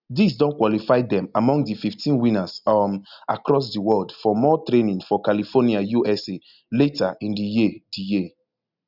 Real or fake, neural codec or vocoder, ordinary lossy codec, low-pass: real; none; none; 5.4 kHz